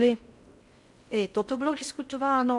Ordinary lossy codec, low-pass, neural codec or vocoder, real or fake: MP3, 64 kbps; 10.8 kHz; codec, 16 kHz in and 24 kHz out, 0.6 kbps, FocalCodec, streaming, 4096 codes; fake